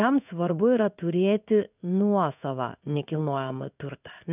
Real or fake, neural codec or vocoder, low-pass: fake; codec, 16 kHz in and 24 kHz out, 1 kbps, XY-Tokenizer; 3.6 kHz